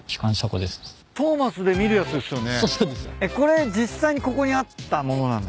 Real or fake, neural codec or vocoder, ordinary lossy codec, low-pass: real; none; none; none